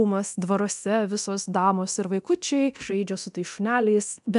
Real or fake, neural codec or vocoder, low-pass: fake; codec, 24 kHz, 0.9 kbps, DualCodec; 10.8 kHz